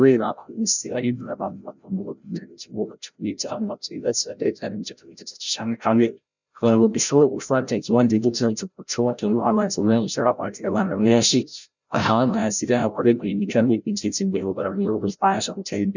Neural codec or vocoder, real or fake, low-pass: codec, 16 kHz, 0.5 kbps, FreqCodec, larger model; fake; 7.2 kHz